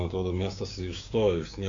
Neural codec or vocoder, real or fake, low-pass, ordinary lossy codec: codec, 16 kHz, 6 kbps, DAC; fake; 7.2 kHz; AAC, 48 kbps